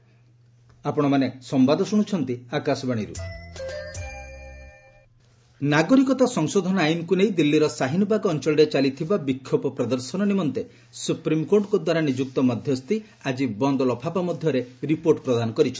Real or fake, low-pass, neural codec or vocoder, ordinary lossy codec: real; none; none; none